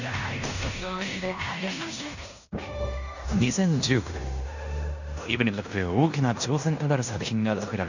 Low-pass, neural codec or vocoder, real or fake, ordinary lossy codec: 7.2 kHz; codec, 16 kHz in and 24 kHz out, 0.9 kbps, LongCat-Audio-Codec, fine tuned four codebook decoder; fake; none